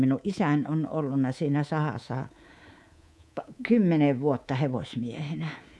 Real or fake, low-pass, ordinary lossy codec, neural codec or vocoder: fake; none; none; codec, 24 kHz, 3.1 kbps, DualCodec